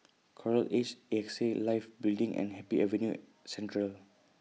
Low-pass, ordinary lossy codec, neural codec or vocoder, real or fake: none; none; none; real